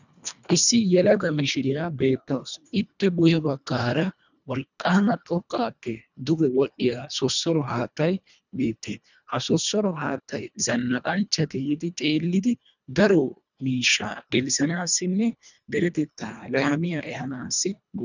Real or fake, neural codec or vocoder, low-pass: fake; codec, 24 kHz, 1.5 kbps, HILCodec; 7.2 kHz